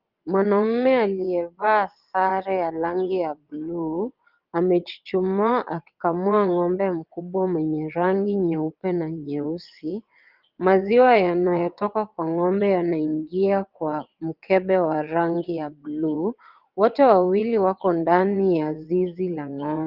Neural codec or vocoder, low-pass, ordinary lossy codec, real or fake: vocoder, 22.05 kHz, 80 mel bands, WaveNeXt; 5.4 kHz; Opus, 24 kbps; fake